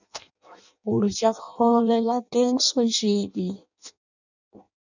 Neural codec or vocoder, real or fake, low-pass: codec, 16 kHz in and 24 kHz out, 0.6 kbps, FireRedTTS-2 codec; fake; 7.2 kHz